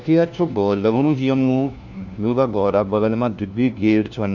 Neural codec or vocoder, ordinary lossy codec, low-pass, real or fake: codec, 16 kHz, 1 kbps, FunCodec, trained on LibriTTS, 50 frames a second; none; 7.2 kHz; fake